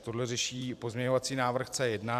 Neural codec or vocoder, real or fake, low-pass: vocoder, 44.1 kHz, 128 mel bands every 512 samples, BigVGAN v2; fake; 14.4 kHz